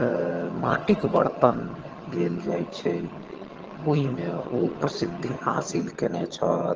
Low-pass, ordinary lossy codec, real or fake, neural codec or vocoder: 7.2 kHz; Opus, 16 kbps; fake; vocoder, 22.05 kHz, 80 mel bands, HiFi-GAN